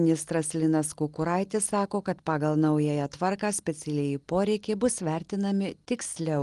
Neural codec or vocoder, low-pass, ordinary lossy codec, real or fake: none; 10.8 kHz; Opus, 24 kbps; real